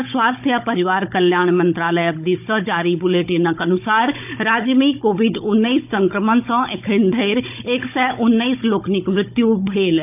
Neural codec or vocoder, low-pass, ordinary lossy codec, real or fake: codec, 16 kHz, 16 kbps, FunCodec, trained on Chinese and English, 50 frames a second; 3.6 kHz; AAC, 32 kbps; fake